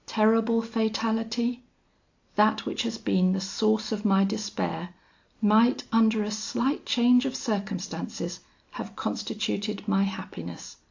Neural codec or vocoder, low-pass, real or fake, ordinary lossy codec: none; 7.2 kHz; real; AAC, 48 kbps